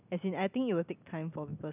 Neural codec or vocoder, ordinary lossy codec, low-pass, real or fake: none; none; 3.6 kHz; real